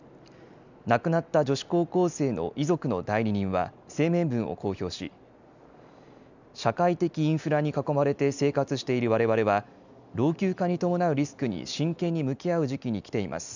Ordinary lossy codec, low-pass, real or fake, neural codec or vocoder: none; 7.2 kHz; real; none